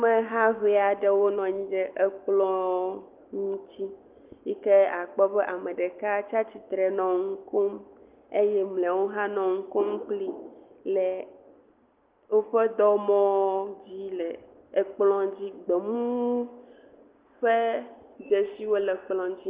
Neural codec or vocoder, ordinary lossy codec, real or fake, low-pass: none; Opus, 32 kbps; real; 3.6 kHz